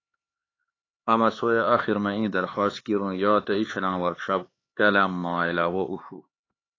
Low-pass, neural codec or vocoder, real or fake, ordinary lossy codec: 7.2 kHz; codec, 16 kHz, 4 kbps, X-Codec, HuBERT features, trained on LibriSpeech; fake; AAC, 32 kbps